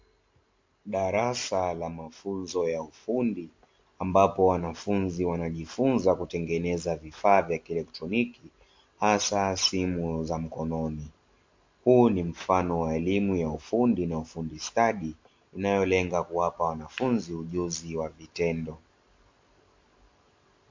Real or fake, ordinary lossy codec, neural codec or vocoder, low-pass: real; MP3, 48 kbps; none; 7.2 kHz